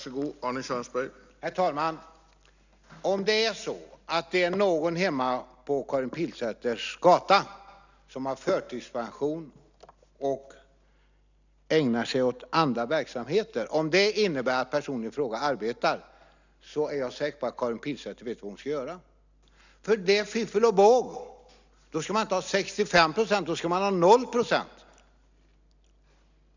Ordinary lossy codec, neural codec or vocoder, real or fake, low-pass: none; none; real; 7.2 kHz